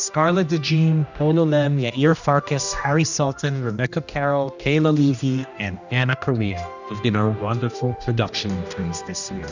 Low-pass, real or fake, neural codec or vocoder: 7.2 kHz; fake; codec, 16 kHz, 1 kbps, X-Codec, HuBERT features, trained on general audio